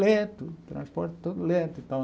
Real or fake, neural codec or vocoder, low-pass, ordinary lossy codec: real; none; none; none